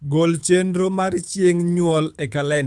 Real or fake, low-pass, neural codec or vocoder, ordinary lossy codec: fake; none; codec, 24 kHz, 6 kbps, HILCodec; none